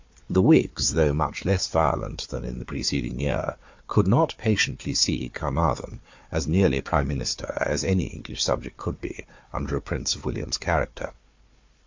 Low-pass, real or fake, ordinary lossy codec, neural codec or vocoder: 7.2 kHz; fake; MP3, 48 kbps; codec, 16 kHz, 4 kbps, FunCodec, trained on Chinese and English, 50 frames a second